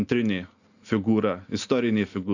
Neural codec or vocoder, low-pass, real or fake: none; 7.2 kHz; real